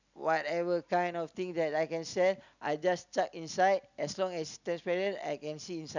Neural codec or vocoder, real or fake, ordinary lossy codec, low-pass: none; real; none; 7.2 kHz